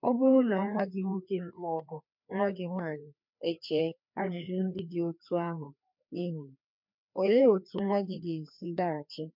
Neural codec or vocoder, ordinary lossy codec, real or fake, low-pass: codec, 16 kHz, 2 kbps, FreqCodec, larger model; MP3, 48 kbps; fake; 5.4 kHz